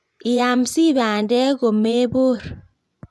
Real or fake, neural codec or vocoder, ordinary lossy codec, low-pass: fake; vocoder, 24 kHz, 100 mel bands, Vocos; none; none